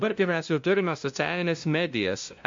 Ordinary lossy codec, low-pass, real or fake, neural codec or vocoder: MP3, 48 kbps; 7.2 kHz; fake; codec, 16 kHz, 0.5 kbps, FunCodec, trained on LibriTTS, 25 frames a second